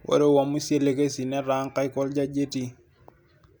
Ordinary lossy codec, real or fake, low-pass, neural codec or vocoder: none; real; none; none